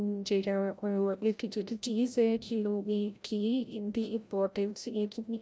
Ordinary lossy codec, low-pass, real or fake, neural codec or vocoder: none; none; fake; codec, 16 kHz, 0.5 kbps, FreqCodec, larger model